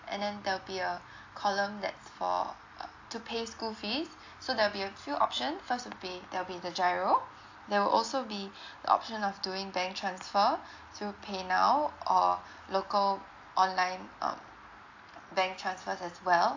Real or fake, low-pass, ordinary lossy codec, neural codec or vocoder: real; 7.2 kHz; AAC, 48 kbps; none